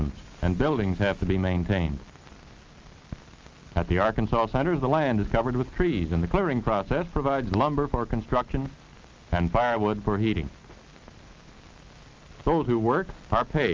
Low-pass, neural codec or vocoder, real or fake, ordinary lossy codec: 7.2 kHz; none; real; Opus, 32 kbps